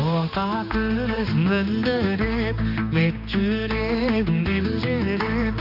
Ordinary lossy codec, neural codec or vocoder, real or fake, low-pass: none; codec, 16 kHz, 2 kbps, X-Codec, HuBERT features, trained on general audio; fake; 5.4 kHz